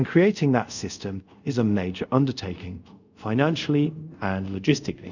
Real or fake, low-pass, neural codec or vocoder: fake; 7.2 kHz; codec, 24 kHz, 0.5 kbps, DualCodec